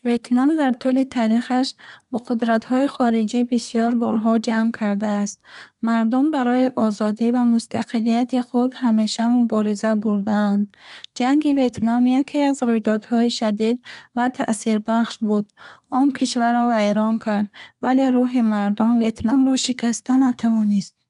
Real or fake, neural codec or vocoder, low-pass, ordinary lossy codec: fake; codec, 24 kHz, 1 kbps, SNAC; 10.8 kHz; none